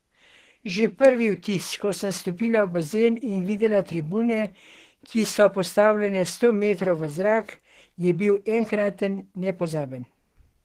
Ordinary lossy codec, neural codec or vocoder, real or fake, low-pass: Opus, 16 kbps; codec, 32 kHz, 1.9 kbps, SNAC; fake; 14.4 kHz